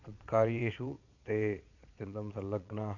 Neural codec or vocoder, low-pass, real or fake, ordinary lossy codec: vocoder, 22.05 kHz, 80 mel bands, Vocos; 7.2 kHz; fake; none